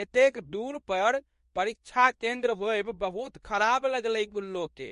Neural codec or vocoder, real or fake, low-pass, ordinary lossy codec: codec, 24 kHz, 0.9 kbps, WavTokenizer, medium speech release version 2; fake; 10.8 kHz; MP3, 48 kbps